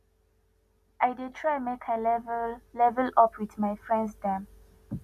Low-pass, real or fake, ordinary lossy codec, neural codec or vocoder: 14.4 kHz; real; none; none